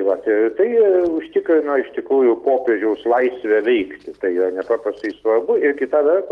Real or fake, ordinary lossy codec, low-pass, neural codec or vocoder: real; Opus, 24 kbps; 14.4 kHz; none